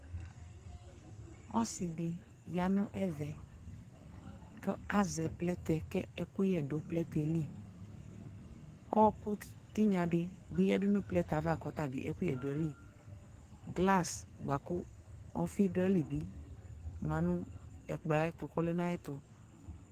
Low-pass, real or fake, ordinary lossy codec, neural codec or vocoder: 14.4 kHz; fake; Opus, 24 kbps; codec, 44.1 kHz, 2.6 kbps, SNAC